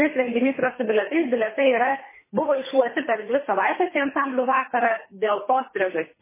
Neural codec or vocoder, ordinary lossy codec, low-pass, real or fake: codec, 24 kHz, 3 kbps, HILCodec; MP3, 16 kbps; 3.6 kHz; fake